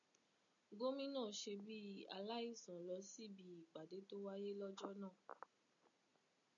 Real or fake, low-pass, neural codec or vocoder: real; 7.2 kHz; none